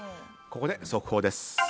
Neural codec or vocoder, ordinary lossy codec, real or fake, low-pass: none; none; real; none